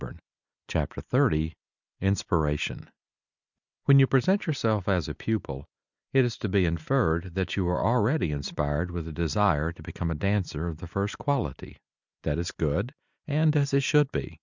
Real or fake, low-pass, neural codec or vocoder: real; 7.2 kHz; none